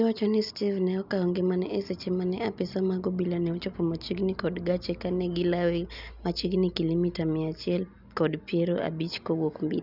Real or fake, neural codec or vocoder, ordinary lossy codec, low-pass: real; none; none; 5.4 kHz